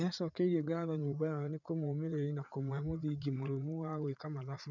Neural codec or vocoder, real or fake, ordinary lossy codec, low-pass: vocoder, 44.1 kHz, 80 mel bands, Vocos; fake; none; 7.2 kHz